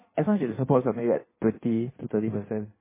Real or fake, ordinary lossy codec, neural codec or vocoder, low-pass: fake; MP3, 16 kbps; codec, 16 kHz in and 24 kHz out, 1.1 kbps, FireRedTTS-2 codec; 3.6 kHz